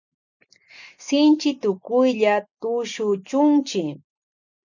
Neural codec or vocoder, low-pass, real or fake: none; 7.2 kHz; real